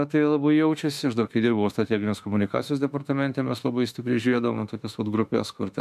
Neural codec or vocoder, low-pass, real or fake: autoencoder, 48 kHz, 32 numbers a frame, DAC-VAE, trained on Japanese speech; 14.4 kHz; fake